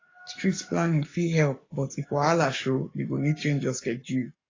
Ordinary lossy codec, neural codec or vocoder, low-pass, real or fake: AAC, 32 kbps; codec, 44.1 kHz, 7.8 kbps, Pupu-Codec; 7.2 kHz; fake